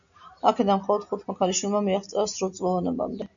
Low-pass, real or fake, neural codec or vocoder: 7.2 kHz; real; none